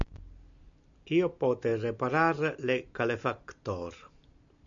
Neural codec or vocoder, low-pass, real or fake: none; 7.2 kHz; real